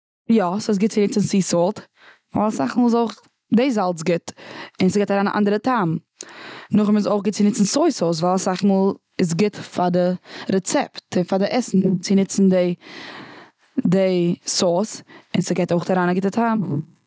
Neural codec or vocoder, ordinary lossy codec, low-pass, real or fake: none; none; none; real